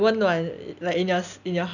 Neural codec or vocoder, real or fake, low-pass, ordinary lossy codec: none; real; 7.2 kHz; none